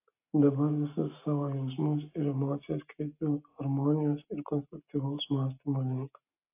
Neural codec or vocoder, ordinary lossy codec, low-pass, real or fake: none; AAC, 32 kbps; 3.6 kHz; real